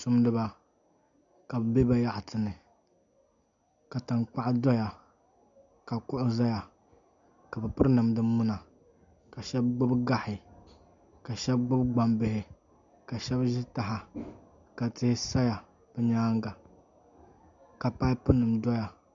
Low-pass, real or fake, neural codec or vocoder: 7.2 kHz; real; none